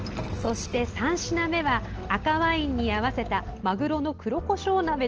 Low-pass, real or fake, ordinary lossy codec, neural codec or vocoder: 7.2 kHz; real; Opus, 16 kbps; none